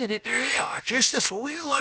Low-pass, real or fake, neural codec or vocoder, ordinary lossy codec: none; fake; codec, 16 kHz, about 1 kbps, DyCAST, with the encoder's durations; none